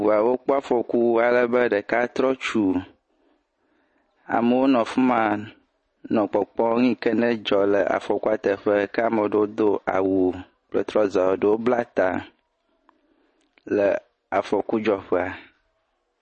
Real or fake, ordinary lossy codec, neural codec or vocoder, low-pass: real; MP3, 32 kbps; none; 10.8 kHz